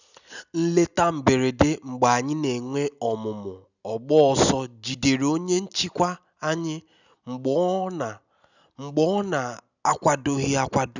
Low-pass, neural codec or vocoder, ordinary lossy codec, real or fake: 7.2 kHz; none; none; real